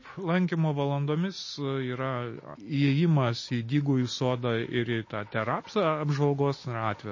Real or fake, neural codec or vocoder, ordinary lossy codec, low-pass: real; none; MP3, 32 kbps; 7.2 kHz